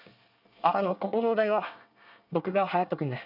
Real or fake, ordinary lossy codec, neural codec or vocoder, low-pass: fake; none; codec, 24 kHz, 1 kbps, SNAC; 5.4 kHz